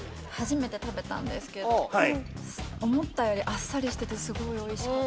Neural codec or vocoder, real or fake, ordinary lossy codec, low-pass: none; real; none; none